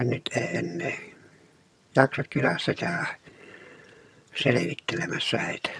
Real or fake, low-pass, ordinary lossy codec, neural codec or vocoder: fake; none; none; vocoder, 22.05 kHz, 80 mel bands, HiFi-GAN